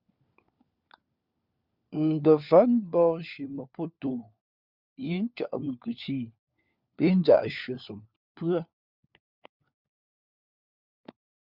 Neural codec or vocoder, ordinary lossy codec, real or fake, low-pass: codec, 16 kHz, 4 kbps, FunCodec, trained on LibriTTS, 50 frames a second; Opus, 64 kbps; fake; 5.4 kHz